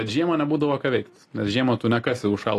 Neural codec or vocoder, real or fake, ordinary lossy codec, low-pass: none; real; AAC, 48 kbps; 14.4 kHz